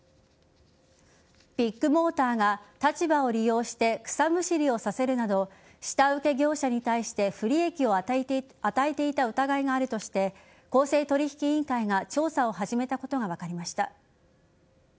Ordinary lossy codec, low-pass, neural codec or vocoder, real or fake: none; none; none; real